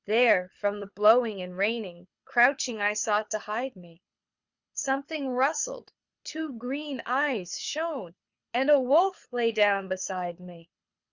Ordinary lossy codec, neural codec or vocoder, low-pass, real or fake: Opus, 64 kbps; codec, 24 kHz, 6 kbps, HILCodec; 7.2 kHz; fake